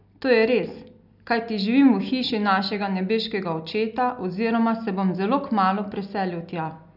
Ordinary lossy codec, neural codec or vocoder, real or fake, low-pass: none; none; real; 5.4 kHz